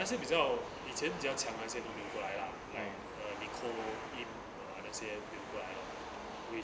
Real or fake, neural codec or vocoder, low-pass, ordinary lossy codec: real; none; none; none